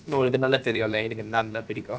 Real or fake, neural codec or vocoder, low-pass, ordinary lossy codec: fake; codec, 16 kHz, about 1 kbps, DyCAST, with the encoder's durations; none; none